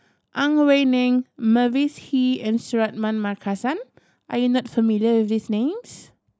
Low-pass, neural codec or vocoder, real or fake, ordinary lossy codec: none; none; real; none